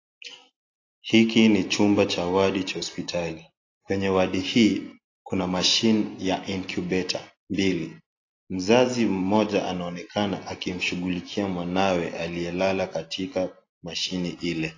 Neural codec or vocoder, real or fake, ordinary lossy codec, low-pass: none; real; AAC, 32 kbps; 7.2 kHz